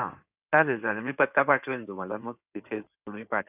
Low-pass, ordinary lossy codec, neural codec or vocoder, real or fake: 3.6 kHz; none; codec, 16 kHz in and 24 kHz out, 2.2 kbps, FireRedTTS-2 codec; fake